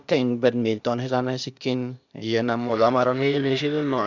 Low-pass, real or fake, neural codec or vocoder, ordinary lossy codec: 7.2 kHz; fake; codec, 16 kHz, 0.8 kbps, ZipCodec; none